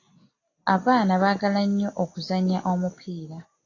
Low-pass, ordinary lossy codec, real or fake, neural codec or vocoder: 7.2 kHz; AAC, 32 kbps; real; none